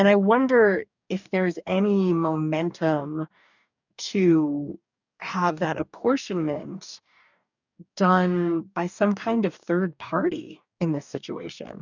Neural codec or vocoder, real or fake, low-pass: codec, 44.1 kHz, 2.6 kbps, DAC; fake; 7.2 kHz